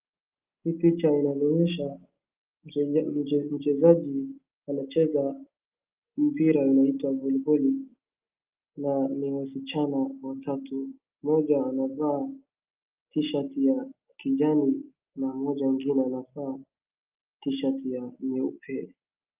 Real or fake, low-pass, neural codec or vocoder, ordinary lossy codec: real; 3.6 kHz; none; Opus, 24 kbps